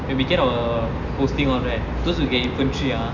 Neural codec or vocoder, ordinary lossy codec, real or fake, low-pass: none; none; real; 7.2 kHz